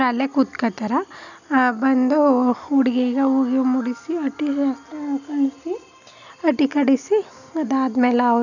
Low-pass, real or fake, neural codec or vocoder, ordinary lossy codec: 7.2 kHz; real; none; none